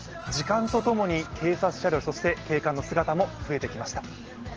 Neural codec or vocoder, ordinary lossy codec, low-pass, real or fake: none; Opus, 16 kbps; 7.2 kHz; real